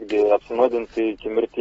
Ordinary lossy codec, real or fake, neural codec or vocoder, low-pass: AAC, 24 kbps; real; none; 19.8 kHz